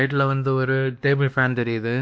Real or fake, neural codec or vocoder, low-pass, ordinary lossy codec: fake; codec, 16 kHz, 1 kbps, X-Codec, WavLM features, trained on Multilingual LibriSpeech; none; none